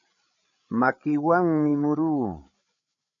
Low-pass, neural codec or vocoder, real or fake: 7.2 kHz; codec, 16 kHz, 8 kbps, FreqCodec, larger model; fake